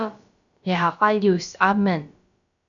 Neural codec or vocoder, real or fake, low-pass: codec, 16 kHz, about 1 kbps, DyCAST, with the encoder's durations; fake; 7.2 kHz